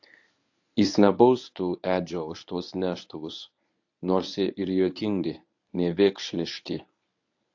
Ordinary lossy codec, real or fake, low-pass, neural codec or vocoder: AAC, 48 kbps; fake; 7.2 kHz; codec, 24 kHz, 0.9 kbps, WavTokenizer, medium speech release version 1